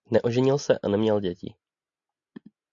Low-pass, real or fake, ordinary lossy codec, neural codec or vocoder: 7.2 kHz; real; AAC, 64 kbps; none